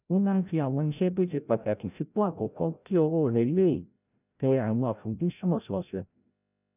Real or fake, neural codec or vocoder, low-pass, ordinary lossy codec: fake; codec, 16 kHz, 0.5 kbps, FreqCodec, larger model; 3.6 kHz; none